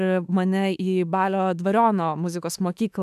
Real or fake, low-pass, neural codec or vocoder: fake; 14.4 kHz; autoencoder, 48 kHz, 32 numbers a frame, DAC-VAE, trained on Japanese speech